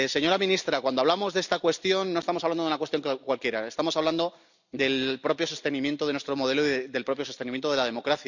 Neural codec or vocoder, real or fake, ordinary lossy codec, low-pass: none; real; none; 7.2 kHz